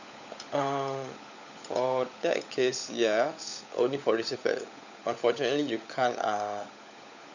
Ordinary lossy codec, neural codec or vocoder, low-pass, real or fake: none; codec, 16 kHz, 16 kbps, FunCodec, trained on LibriTTS, 50 frames a second; 7.2 kHz; fake